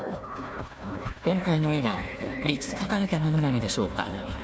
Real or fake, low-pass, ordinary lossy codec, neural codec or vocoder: fake; none; none; codec, 16 kHz, 1 kbps, FunCodec, trained on Chinese and English, 50 frames a second